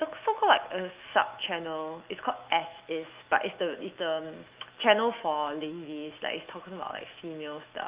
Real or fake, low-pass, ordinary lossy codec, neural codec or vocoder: fake; 3.6 kHz; Opus, 32 kbps; autoencoder, 48 kHz, 128 numbers a frame, DAC-VAE, trained on Japanese speech